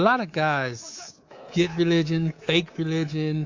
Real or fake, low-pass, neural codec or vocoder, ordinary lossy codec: fake; 7.2 kHz; codec, 24 kHz, 3.1 kbps, DualCodec; AAC, 48 kbps